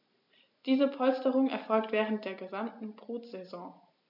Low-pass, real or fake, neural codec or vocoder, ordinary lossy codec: 5.4 kHz; real; none; MP3, 48 kbps